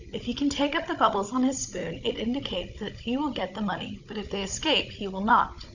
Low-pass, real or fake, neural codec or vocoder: 7.2 kHz; fake; codec, 16 kHz, 16 kbps, FunCodec, trained on Chinese and English, 50 frames a second